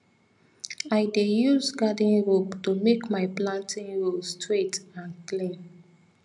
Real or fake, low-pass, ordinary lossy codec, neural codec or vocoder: real; 10.8 kHz; none; none